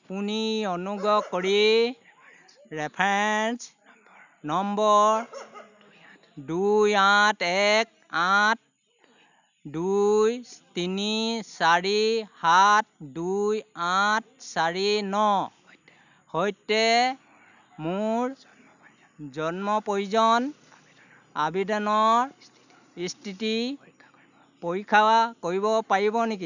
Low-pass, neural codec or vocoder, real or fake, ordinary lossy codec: 7.2 kHz; none; real; none